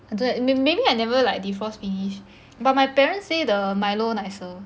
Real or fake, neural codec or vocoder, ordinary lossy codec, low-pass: real; none; none; none